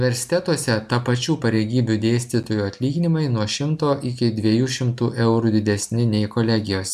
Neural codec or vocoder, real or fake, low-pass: none; real; 14.4 kHz